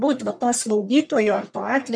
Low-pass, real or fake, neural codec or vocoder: 9.9 kHz; fake; codec, 44.1 kHz, 1.7 kbps, Pupu-Codec